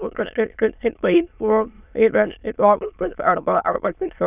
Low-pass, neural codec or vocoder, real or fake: 3.6 kHz; autoencoder, 22.05 kHz, a latent of 192 numbers a frame, VITS, trained on many speakers; fake